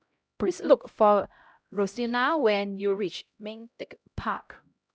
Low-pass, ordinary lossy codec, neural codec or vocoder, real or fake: none; none; codec, 16 kHz, 0.5 kbps, X-Codec, HuBERT features, trained on LibriSpeech; fake